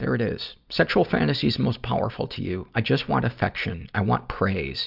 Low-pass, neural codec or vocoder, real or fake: 5.4 kHz; none; real